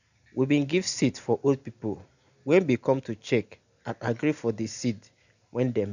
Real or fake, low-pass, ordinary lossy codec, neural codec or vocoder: real; 7.2 kHz; none; none